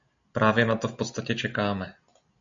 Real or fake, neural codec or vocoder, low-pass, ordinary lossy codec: real; none; 7.2 kHz; MP3, 64 kbps